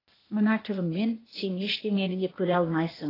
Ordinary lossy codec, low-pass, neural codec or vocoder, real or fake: AAC, 24 kbps; 5.4 kHz; codec, 16 kHz, 0.8 kbps, ZipCodec; fake